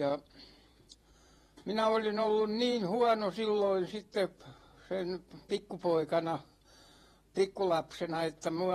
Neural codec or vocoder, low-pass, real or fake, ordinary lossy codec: vocoder, 48 kHz, 128 mel bands, Vocos; 19.8 kHz; fake; AAC, 32 kbps